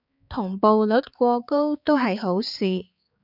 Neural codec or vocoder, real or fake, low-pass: codec, 16 kHz, 4 kbps, X-Codec, HuBERT features, trained on balanced general audio; fake; 5.4 kHz